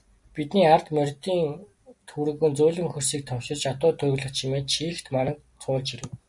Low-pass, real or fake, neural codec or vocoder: 10.8 kHz; real; none